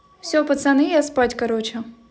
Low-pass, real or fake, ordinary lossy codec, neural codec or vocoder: none; real; none; none